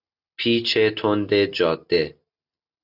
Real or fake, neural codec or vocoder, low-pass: real; none; 5.4 kHz